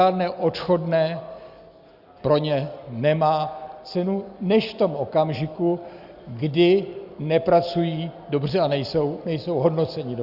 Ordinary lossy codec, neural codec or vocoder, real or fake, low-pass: Opus, 64 kbps; none; real; 5.4 kHz